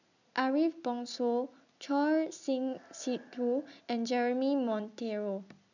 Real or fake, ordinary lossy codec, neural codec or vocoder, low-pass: real; none; none; 7.2 kHz